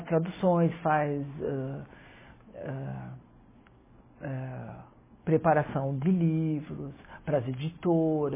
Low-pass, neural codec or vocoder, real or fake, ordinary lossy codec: 3.6 kHz; none; real; MP3, 16 kbps